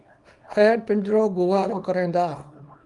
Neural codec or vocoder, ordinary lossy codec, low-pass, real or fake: codec, 24 kHz, 0.9 kbps, WavTokenizer, small release; Opus, 32 kbps; 10.8 kHz; fake